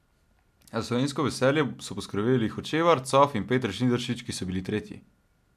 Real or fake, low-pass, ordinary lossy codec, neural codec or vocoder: real; 14.4 kHz; AAC, 96 kbps; none